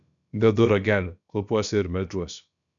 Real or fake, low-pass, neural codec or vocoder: fake; 7.2 kHz; codec, 16 kHz, about 1 kbps, DyCAST, with the encoder's durations